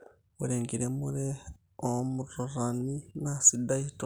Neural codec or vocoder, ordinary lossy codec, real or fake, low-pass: none; none; real; none